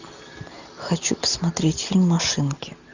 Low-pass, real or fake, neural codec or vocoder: 7.2 kHz; real; none